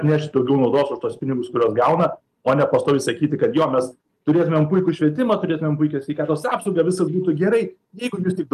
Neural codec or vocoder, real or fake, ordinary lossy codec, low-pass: none; real; Opus, 32 kbps; 14.4 kHz